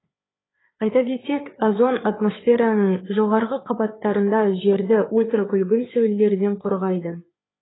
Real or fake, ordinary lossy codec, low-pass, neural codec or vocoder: fake; AAC, 16 kbps; 7.2 kHz; codec, 16 kHz, 4 kbps, FreqCodec, larger model